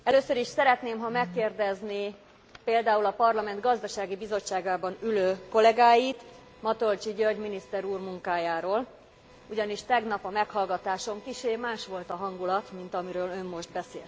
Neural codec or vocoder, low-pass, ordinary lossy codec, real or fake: none; none; none; real